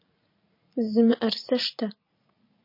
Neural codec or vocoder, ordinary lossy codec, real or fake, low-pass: vocoder, 44.1 kHz, 80 mel bands, Vocos; MP3, 32 kbps; fake; 5.4 kHz